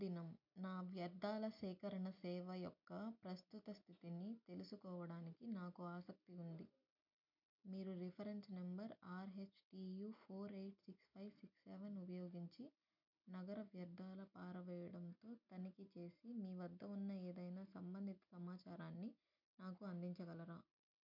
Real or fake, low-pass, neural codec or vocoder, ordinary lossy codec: real; 5.4 kHz; none; MP3, 48 kbps